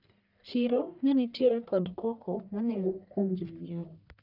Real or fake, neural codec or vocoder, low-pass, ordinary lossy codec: fake; codec, 44.1 kHz, 1.7 kbps, Pupu-Codec; 5.4 kHz; none